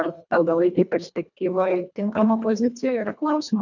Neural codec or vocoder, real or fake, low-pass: codec, 24 kHz, 1.5 kbps, HILCodec; fake; 7.2 kHz